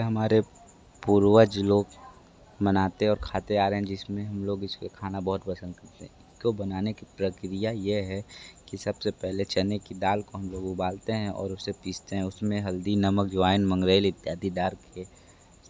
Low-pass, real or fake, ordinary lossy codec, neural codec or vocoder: none; real; none; none